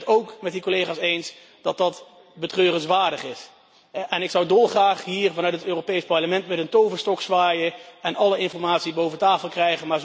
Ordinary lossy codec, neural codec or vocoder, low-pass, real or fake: none; none; none; real